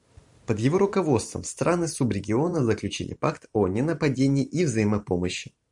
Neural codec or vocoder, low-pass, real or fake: vocoder, 44.1 kHz, 128 mel bands every 512 samples, BigVGAN v2; 10.8 kHz; fake